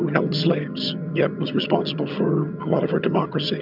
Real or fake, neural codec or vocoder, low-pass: fake; vocoder, 22.05 kHz, 80 mel bands, HiFi-GAN; 5.4 kHz